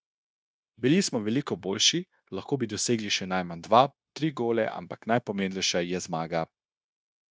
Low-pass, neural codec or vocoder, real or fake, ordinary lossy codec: none; codec, 16 kHz, 0.9 kbps, LongCat-Audio-Codec; fake; none